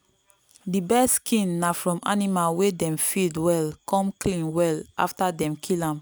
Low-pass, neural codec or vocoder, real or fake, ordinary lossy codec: none; none; real; none